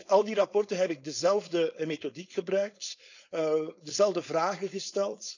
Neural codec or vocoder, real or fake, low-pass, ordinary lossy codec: codec, 16 kHz, 4.8 kbps, FACodec; fake; 7.2 kHz; none